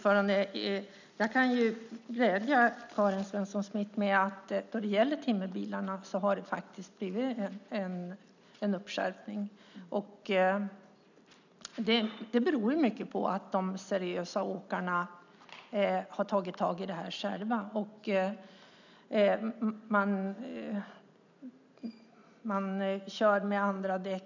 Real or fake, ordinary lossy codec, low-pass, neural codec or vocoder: real; none; 7.2 kHz; none